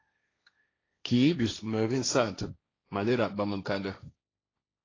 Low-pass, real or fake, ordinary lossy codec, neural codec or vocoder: 7.2 kHz; fake; AAC, 32 kbps; codec, 16 kHz, 1.1 kbps, Voila-Tokenizer